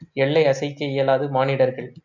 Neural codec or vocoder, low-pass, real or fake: none; 7.2 kHz; real